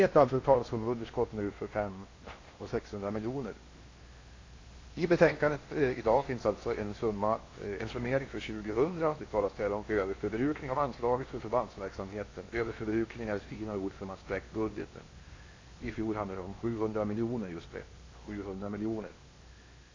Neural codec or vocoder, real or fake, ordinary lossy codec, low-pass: codec, 16 kHz in and 24 kHz out, 0.8 kbps, FocalCodec, streaming, 65536 codes; fake; AAC, 32 kbps; 7.2 kHz